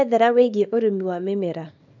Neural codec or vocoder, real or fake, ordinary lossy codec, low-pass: codec, 24 kHz, 0.9 kbps, WavTokenizer, small release; fake; none; 7.2 kHz